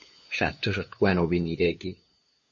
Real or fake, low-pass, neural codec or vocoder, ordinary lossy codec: fake; 7.2 kHz; codec, 16 kHz, 2 kbps, FunCodec, trained on LibriTTS, 25 frames a second; MP3, 32 kbps